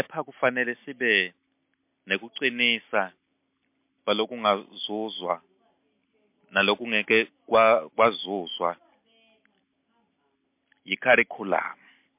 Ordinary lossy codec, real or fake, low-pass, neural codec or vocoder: MP3, 32 kbps; real; 3.6 kHz; none